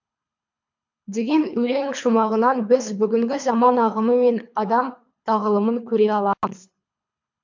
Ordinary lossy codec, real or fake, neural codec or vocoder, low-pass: MP3, 64 kbps; fake; codec, 24 kHz, 3 kbps, HILCodec; 7.2 kHz